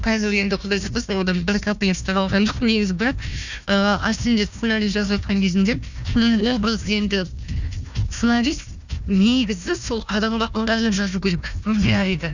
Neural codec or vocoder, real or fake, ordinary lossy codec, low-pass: codec, 16 kHz, 1 kbps, FunCodec, trained on Chinese and English, 50 frames a second; fake; none; 7.2 kHz